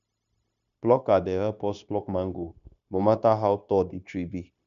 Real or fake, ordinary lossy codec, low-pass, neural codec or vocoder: fake; none; 7.2 kHz; codec, 16 kHz, 0.9 kbps, LongCat-Audio-Codec